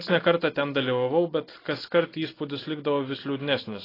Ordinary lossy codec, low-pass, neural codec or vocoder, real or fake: AAC, 24 kbps; 5.4 kHz; none; real